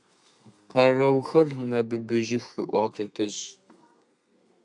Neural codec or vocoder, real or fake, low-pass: codec, 32 kHz, 1.9 kbps, SNAC; fake; 10.8 kHz